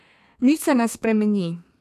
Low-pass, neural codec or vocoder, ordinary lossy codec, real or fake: 14.4 kHz; codec, 32 kHz, 1.9 kbps, SNAC; none; fake